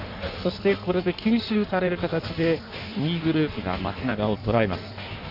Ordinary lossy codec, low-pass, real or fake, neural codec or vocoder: none; 5.4 kHz; fake; codec, 16 kHz in and 24 kHz out, 1.1 kbps, FireRedTTS-2 codec